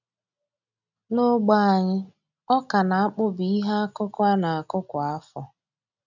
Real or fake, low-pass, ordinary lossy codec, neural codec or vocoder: real; 7.2 kHz; none; none